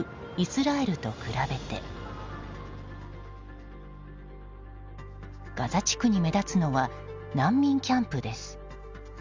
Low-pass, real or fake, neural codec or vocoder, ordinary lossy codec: 7.2 kHz; real; none; Opus, 32 kbps